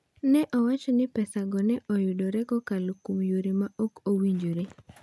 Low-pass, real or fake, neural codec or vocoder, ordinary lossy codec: none; real; none; none